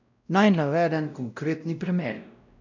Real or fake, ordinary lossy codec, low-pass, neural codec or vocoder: fake; none; 7.2 kHz; codec, 16 kHz, 0.5 kbps, X-Codec, WavLM features, trained on Multilingual LibriSpeech